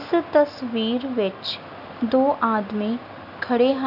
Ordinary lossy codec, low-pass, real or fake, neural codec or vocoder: none; 5.4 kHz; real; none